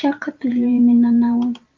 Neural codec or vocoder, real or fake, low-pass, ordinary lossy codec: vocoder, 44.1 kHz, 128 mel bands every 512 samples, BigVGAN v2; fake; 7.2 kHz; Opus, 32 kbps